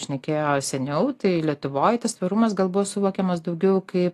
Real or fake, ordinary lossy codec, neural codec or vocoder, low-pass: real; AAC, 48 kbps; none; 14.4 kHz